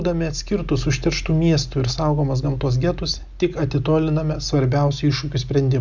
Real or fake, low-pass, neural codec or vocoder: real; 7.2 kHz; none